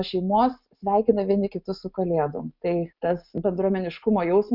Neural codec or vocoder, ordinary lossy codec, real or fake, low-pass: none; Opus, 64 kbps; real; 5.4 kHz